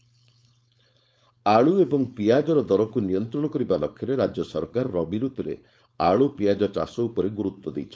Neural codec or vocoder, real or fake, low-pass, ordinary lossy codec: codec, 16 kHz, 4.8 kbps, FACodec; fake; none; none